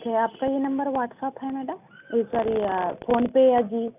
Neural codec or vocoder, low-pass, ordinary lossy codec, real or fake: none; 3.6 kHz; none; real